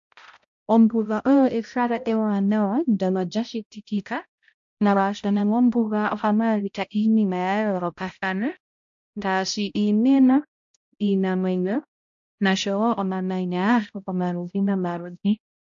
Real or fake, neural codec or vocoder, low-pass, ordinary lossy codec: fake; codec, 16 kHz, 0.5 kbps, X-Codec, HuBERT features, trained on balanced general audio; 7.2 kHz; AAC, 64 kbps